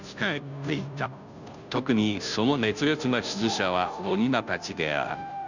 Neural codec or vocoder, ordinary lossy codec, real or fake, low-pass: codec, 16 kHz, 0.5 kbps, FunCodec, trained on Chinese and English, 25 frames a second; none; fake; 7.2 kHz